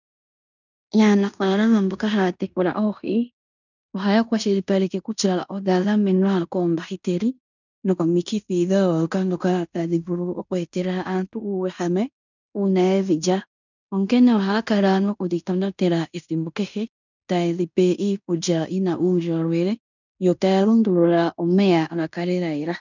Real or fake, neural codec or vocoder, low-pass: fake; codec, 16 kHz in and 24 kHz out, 0.9 kbps, LongCat-Audio-Codec, fine tuned four codebook decoder; 7.2 kHz